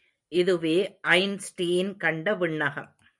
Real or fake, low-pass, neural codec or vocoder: real; 10.8 kHz; none